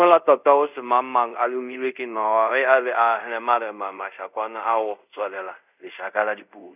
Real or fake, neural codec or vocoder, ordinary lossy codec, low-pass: fake; codec, 24 kHz, 0.5 kbps, DualCodec; none; 3.6 kHz